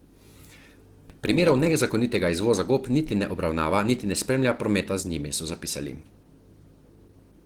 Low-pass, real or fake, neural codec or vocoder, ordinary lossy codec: 19.8 kHz; real; none; Opus, 16 kbps